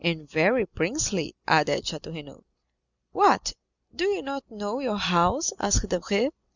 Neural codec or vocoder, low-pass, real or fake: none; 7.2 kHz; real